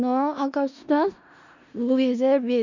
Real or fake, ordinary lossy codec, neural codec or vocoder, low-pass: fake; none; codec, 16 kHz in and 24 kHz out, 0.4 kbps, LongCat-Audio-Codec, four codebook decoder; 7.2 kHz